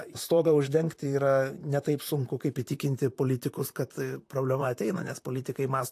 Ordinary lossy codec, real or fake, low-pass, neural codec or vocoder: AAC, 64 kbps; fake; 14.4 kHz; vocoder, 44.1 kHz, 128 mel bands, Pupu-Vocoder